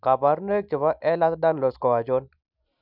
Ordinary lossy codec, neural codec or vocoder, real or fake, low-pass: none; none; real; 5.4 kHz